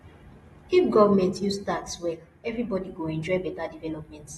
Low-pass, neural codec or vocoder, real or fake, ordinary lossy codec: 19.8 kHz; none; real; AAC, 32 kbps